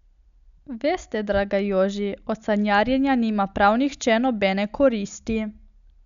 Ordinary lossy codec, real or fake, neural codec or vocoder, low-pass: none; real; none; 7.2 kHz